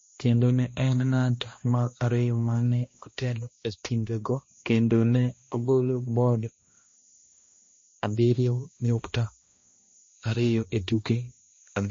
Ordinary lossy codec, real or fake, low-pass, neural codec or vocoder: MP3, 32 kbps; fake; 7.2 kHz; codec, 16 kHz, 1 kbps, X-Codec, HuBERT features, trained on balanced general audio